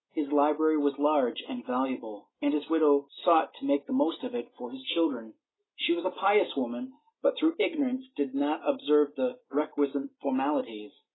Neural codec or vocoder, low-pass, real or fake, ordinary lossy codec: none; 7.2 kHz; real; AAC, 16 kbps